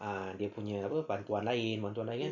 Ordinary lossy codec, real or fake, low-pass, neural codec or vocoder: none; real; 7.2 kHz; none